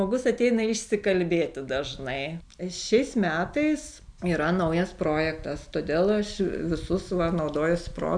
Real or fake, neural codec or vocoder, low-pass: real; none; 9.9 kHz